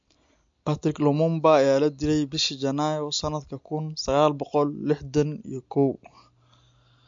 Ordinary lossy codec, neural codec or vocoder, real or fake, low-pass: MP3, 48 kbps; none; real; 7.2 kHz